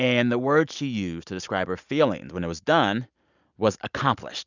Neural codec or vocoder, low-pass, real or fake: none; 7.2 kHz; real